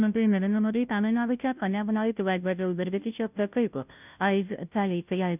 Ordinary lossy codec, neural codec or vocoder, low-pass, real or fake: none; codec, 16 kHz, 0.5 kbps, FunCodec, trained on Chinese and English, 25 frames a second; 3.6 kHz; fake